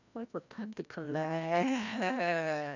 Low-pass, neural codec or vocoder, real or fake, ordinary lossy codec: 7.2 kHz; codec, 16 kHz, 1 kbps, FreqCodec, larger model; fake; none